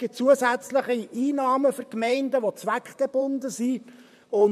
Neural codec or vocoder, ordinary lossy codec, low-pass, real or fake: vocoder, 44.1 kHz, 128 mel bands, Pupu-Vocoder; MP3, 96 kbps; 14.4 kHz; fake